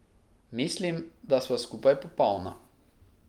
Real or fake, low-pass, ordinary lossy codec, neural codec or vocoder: real; 19.8 kHz; Opus, 32 kbps; none